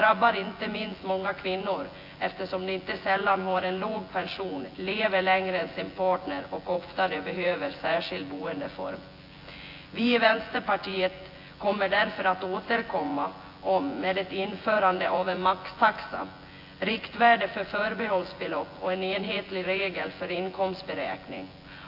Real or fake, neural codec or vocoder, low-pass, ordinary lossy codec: fake; vocoder, 24 kHz, 100 mel bands, Vocos; 5.4 kHz; AAC, 32 kbps